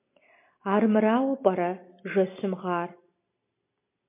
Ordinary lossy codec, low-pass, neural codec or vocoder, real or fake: MP3, 24 kbps; 3.6 kHz; none; real